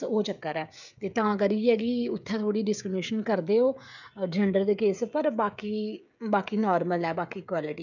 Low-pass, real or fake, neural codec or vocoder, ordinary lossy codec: 7.2 kHz; fake; codec, 16 kHz, 16 kbps, FreqCodec, smaller model; none